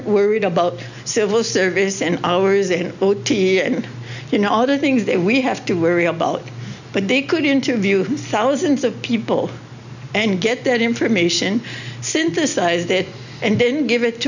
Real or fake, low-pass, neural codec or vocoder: real; 7.2 kHz; none